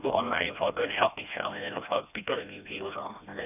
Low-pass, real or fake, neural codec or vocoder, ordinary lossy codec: 3.6 kHz; fake; codec, 16 kHz, 1 kbps, FreqCodec, smaller model; none